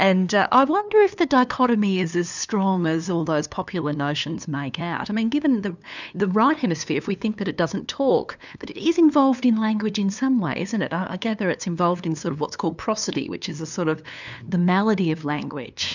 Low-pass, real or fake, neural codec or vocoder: 7.2 kHz; fake; codec, 16 kHz, 2 kbps, FunCodec, trained on LibriTTS, 25 frames a second